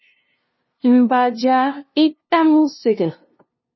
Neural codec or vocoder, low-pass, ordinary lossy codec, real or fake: codec, 16 kHz, 0.5 kbps, FunCodec, trained on LibriTTS, 25 frames a second; 7.2 kHz; MP3, 24 kbps; fake